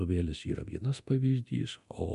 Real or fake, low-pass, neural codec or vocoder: fake; 10.8 kHz; codec, 24 kHz, 0.9 kbps, DualCodec